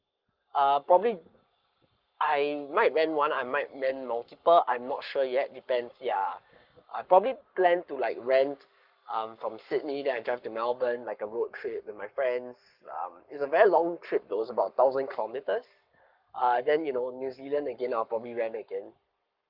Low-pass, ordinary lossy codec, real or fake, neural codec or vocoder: 5.4 kHz; Opus, 32 kbps; fake; codec, 44.1 kHz, 7.8 kbps, Pupu-Codec